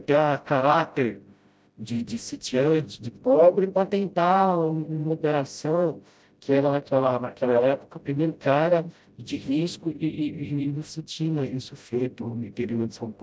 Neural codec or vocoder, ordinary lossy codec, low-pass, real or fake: codec, 16 kHz, 0.5 kbps, FreqCodec, smaller model; none; none; fake